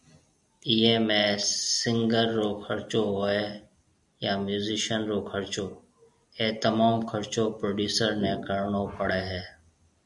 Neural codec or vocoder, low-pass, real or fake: none; 10.8 kHz; real